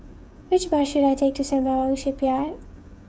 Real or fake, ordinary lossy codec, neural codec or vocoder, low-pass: fake; none; codec, 16 kHz, 16 kbps, FreqCodec, smaller model; none